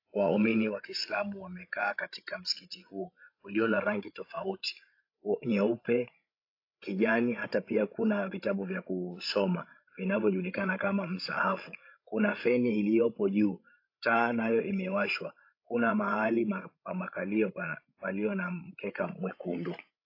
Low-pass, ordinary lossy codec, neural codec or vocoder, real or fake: 5.4 kHz; AAC, 32 kbps; codec, 16 kHz, 16 kbps, FreqCodec, larger model; fake